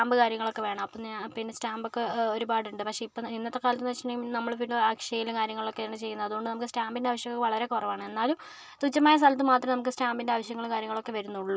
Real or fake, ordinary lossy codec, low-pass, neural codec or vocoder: real; none; none; none